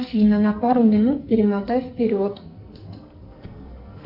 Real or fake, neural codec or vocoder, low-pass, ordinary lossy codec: fake; codec, 44.1 kHz, 2.6 kbps, SNAC; 5.4 kHz; Opus, 64 kbps